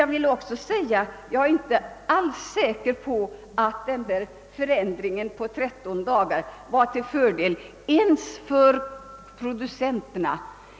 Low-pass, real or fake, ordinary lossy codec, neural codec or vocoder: none; real; none; none